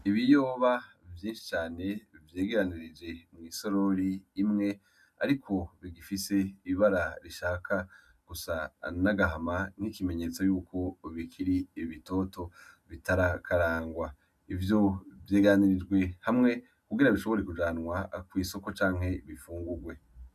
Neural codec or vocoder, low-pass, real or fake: none; 14.4 kHz; real